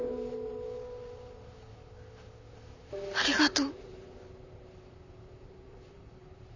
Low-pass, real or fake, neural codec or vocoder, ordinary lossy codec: 7.2 kHz; fake; vocoder, 44.1 kHz, 128 mel bands, Pupu-Vocoder; none